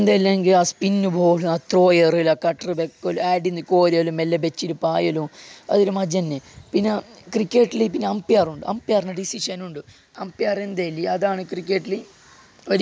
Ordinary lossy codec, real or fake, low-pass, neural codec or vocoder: none; real; none; none